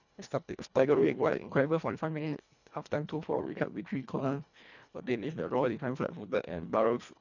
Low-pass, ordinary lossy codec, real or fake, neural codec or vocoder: 7.2 kHz; none; fake; codec, 24 kHz, 1.5 kbps, HILCodec